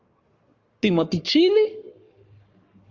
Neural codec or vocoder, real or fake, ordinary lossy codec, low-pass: codec, 44.1 kHz, 7.8 kbps, Pupu-Codec; fake; Opus, 32 kbps; 7.2 kHz